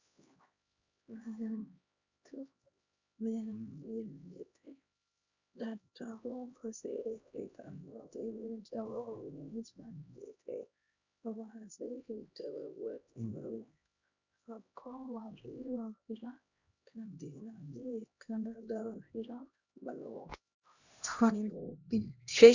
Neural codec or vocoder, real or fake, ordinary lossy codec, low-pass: codec, 16 kHz, 1 kbps, X-Codec, HuBERT features, trained on LibriSpeech; fake; Opus, 64 kbps; 7.2 kHz